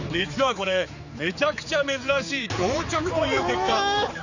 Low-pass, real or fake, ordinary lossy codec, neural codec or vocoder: 7.2 kHz; fake; none; codec, 16 kHz, 4 kbps, X-Codec, HuBERT features, trained on general audio